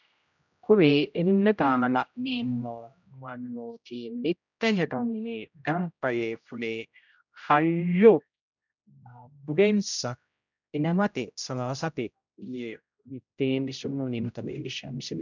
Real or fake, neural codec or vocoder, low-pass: fake; codec, 16 kHz, 0.5 kbps, X-Codec, HuBERT features, trained on general audio; 7.2 kHz